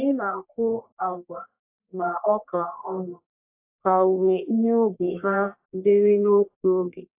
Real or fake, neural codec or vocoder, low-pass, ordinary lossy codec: fake; codec, 44.1 kHz, 1.7 kbps, Pupu-Codec; 3.6 kHz; AAC, 24 kbps